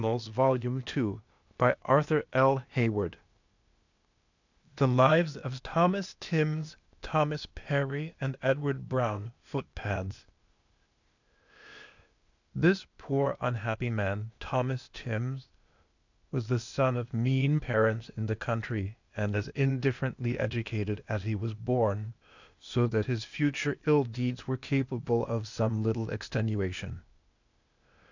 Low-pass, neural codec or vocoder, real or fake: 7.2 kHz; codec, 16 kHz, 0.8 kbps, ZipCodec; fake